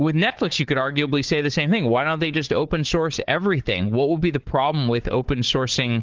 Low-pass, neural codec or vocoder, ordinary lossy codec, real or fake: 7.2 kHz; codec, 16 kHz, 4 kbps, FunCodec, trained on Chinese and English, 50 frames a second; Opus, 16 kbps; fake